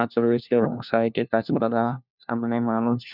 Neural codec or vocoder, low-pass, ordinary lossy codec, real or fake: codec, 16 kHz, 1 kbps, FunCodec, trained on LibriTTS, 50 frames a second; 5.4 kHz; none; fake